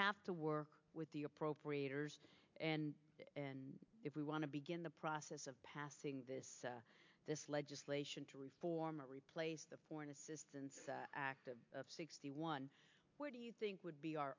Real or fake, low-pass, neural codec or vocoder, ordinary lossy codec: real; 7.2 kHz; none; MP3, 48 kbps